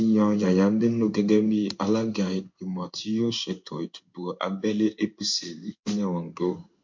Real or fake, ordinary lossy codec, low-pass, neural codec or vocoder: fake; MP3, 48 kbps; 7.2 kHz; codec, 16 kHz in and 24 kHz out, 1 kbps, XY-Tokenizer